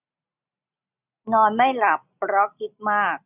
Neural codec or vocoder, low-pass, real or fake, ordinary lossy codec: none; 3.6 kHz; real; none